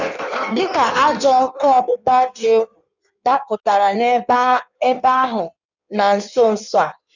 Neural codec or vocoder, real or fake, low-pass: codec, 16 kHz in and 24 kHz out, 1.1 kbps, FireRedTTS-2 codec; fake; 7.2 kHz